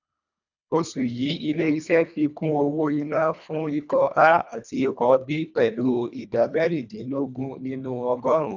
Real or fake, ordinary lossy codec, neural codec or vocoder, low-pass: fake; none; codec, 24 kHz, 1.5 kbps, HILCodec; 7.2 kHz